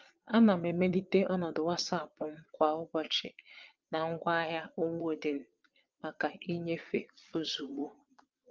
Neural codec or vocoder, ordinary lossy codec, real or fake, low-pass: vocoder, 22.05 kHz, 80 mel bands, Vocos; Opus, 24 kbps; fake; 7.2 kHz